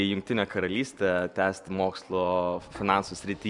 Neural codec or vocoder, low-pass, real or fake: none; 10.8 kHz; real